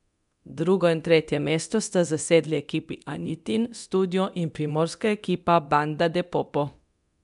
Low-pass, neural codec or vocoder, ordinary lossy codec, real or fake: 10.8 kHz; codec, 24 kHz, 0.9 kbps, DualCodec; MP3, 96 kbps; fake